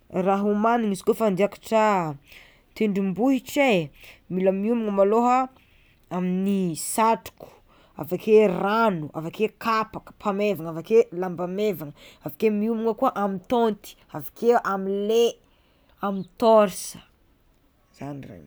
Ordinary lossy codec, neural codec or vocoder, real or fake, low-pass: none; none; real; none